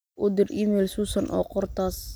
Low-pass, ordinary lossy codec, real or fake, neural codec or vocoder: none; none; real; none